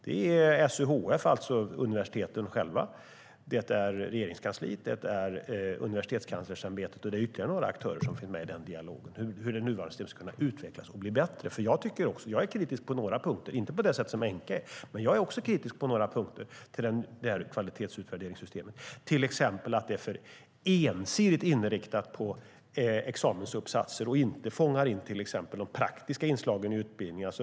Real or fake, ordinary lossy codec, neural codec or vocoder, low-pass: real; none; none; none